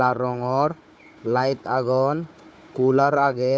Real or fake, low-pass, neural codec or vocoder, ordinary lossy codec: fake; none; codec, 16 kHz, 4 kbps, FunCodec, trained on Chinese and English, 50 frames a second; none